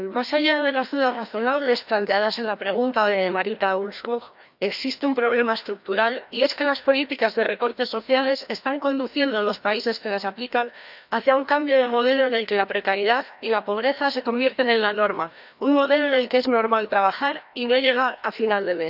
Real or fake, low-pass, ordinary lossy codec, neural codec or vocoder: fake; 5.4 kHz; none; codec, 16 kHz, 1 kbps, FreqCodec, larger model